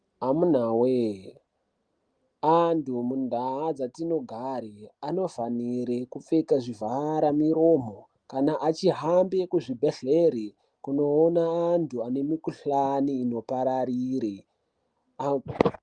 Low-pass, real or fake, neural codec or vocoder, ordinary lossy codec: 9.9 kHz; real; none; Opus, 32 kbps